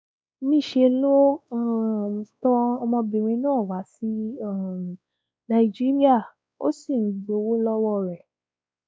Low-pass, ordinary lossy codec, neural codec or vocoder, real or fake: none; none; codec, 16 kHz, 2 kbps, X-Codec, WavLM features, trained on Multilingual LibriSpeech; fake